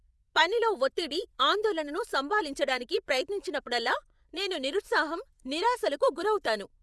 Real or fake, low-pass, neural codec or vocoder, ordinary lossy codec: fake; none; vocoder, 24 kHz, 100 mel bands, Vocos; none